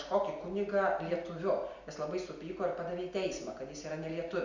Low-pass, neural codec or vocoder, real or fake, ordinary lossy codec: 7.2 kHz; none; real; Opus, 64 kbps